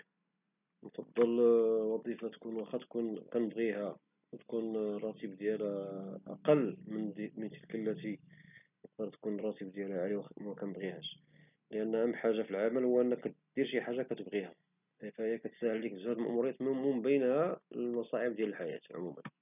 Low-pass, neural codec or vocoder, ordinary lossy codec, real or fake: 3.6 kHz; none; none; real